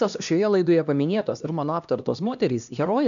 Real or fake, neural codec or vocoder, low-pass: fake; codec, 16 kHz, 1 kbps, X-Codec, HuBERT features, trained on LibriSpeech; 7.2 kHz